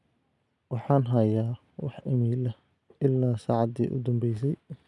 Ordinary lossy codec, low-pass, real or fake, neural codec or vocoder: none; none; real; none